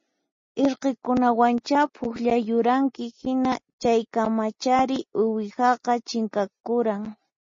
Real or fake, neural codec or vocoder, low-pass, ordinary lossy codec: real; none; 7.2 kHz; MP3, 32 kbps